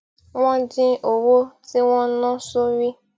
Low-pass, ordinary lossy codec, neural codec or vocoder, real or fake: none; none; none; real